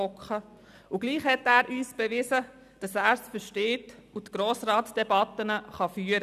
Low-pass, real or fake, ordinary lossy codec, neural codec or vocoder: 14.4 kHz; real; none; none